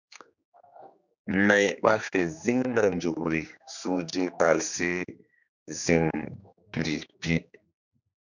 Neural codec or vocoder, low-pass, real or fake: codec, 16 kHz, 2 kbps, X-Codec, HuBERT features, trained on general audio; 7.2 kHz; fake